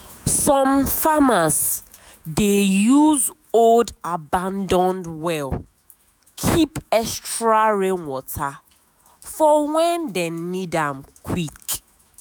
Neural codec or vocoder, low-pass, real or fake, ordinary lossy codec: autoencoder, 48 kHz, 128 numbers a frame, DAC-VAE, trained on Japanese speech; none; fake; none